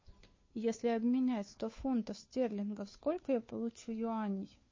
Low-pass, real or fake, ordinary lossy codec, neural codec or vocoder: 7.2 kHz; fake; MP3, 32 kbps; codec, 16 kHz, 2 kbps, FunCodec, trained on Chinese and English, 25 frames a second